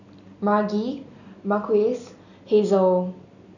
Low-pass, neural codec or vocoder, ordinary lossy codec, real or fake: 7.2 kHz; none; none; real